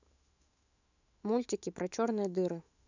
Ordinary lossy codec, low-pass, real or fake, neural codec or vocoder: none; 7.2 kHz; fake; autoencoder, 48 kHz, 128 numbers a frame, DAC-VAE, trained on Japanese speech